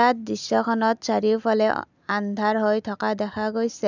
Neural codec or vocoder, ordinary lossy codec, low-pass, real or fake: none; none; 7.2 kHz; real